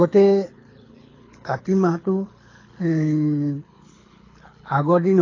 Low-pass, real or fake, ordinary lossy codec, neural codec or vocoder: 7.2 kHz; fake; AAC, 32 kbps; codec, 24 kHz, 6 kbps, HILCodec